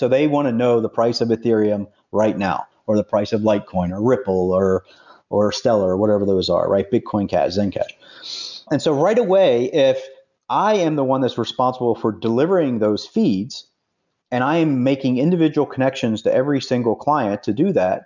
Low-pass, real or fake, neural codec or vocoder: 7.2 kHz; real; none